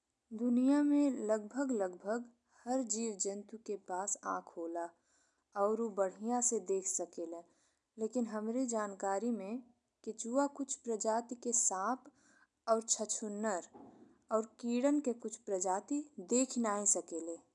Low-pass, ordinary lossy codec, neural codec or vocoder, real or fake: 10.8 kHz; none; none; real